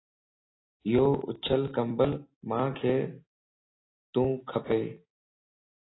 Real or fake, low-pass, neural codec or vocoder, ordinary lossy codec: real; 7.2 kHz; none; AAC, 16 kbps